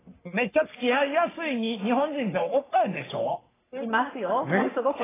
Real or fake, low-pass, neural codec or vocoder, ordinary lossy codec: fake; 3.6 kHz; codec, 44.1 kHz, 3.4 kbps, Pupu-Codec; AAC, 16 kbps